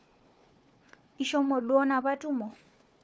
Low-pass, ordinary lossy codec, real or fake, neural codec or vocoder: none; none; fake; codec, 16 kHz, 4 kbps, FunCodec, trained on Chinese and English, 50 frames a second